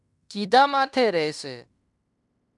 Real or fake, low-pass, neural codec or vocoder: fake; 10.8 kHz; codec, 16 kHz in and 24 kHz out, 0.9 kbps, LongCat-Audio-Codec, fine tuned four codebook decoder